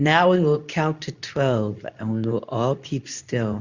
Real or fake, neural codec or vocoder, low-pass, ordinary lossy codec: fake; codec, 24 kHz, 0.9 kbps, WavTokenizer, medium speech release version 2; 7.2 kHz; Opus, 64 kbps